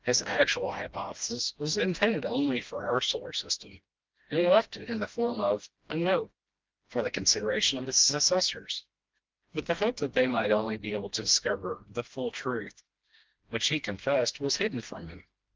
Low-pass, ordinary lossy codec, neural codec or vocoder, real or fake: 7.2 kHz; Opus, 32 kbps; codec, 16 kHz, 1 kbps, FreqCodec, smaller model; fake